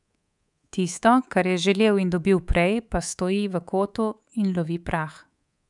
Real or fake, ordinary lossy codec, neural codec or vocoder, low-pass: fake; none; codec, 24 kHz, 3.1 kbps, DualCodec; 10.8 kHz